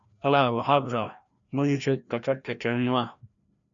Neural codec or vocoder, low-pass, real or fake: codec, 16 kHz, 1 kbps, FreqCodec, larger model; 7.2 kHz; fake